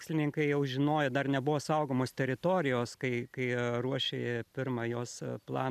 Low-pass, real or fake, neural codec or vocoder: 14.4 kHz; real; none